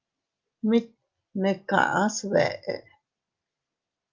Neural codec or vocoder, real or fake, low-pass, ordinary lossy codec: none; real; 7.2 kHz; Opus, 24 kbps